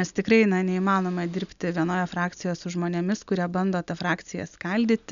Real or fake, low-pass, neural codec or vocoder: real; 7.2 kHz; none